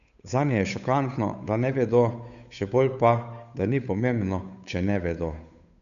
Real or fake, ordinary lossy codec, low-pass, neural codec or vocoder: fake; none; 7.2 kHz; codec, 16 kHz, 8 kbps, FunCodec, trained on Chinese and English, 25 frames a second